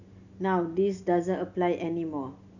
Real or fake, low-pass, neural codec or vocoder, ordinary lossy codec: real; 7.2 kHz; none; none